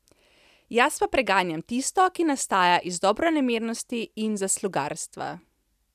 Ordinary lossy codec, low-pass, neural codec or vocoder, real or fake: none; 14.4 kHz; none; real